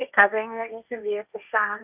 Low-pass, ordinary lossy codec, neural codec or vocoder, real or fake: 3.6 kHz; none; codec, 16 kHz, 1.1 kbps, Voila-Tokenizer; fake